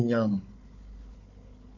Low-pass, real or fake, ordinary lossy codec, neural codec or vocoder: 7.2 kHz; fake; MP3, 48 kbps; codec, 16 kHz, 4 kbps, FunCodec, trained on Chinese and English, 50 frames a second